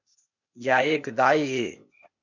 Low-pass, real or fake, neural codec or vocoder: 7.2 kHz; fake; codec, 16 kHz, 0.8 kbps, ZipCodec